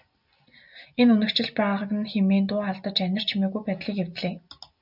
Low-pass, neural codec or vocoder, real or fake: 5.4 kHz; none; real